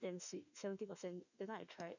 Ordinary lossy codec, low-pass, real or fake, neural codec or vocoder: none; 7.2 kHz; fake; autoencoder, 48 kHz, 32 numbers a frame, DAC-VAE, trained on Japanese speech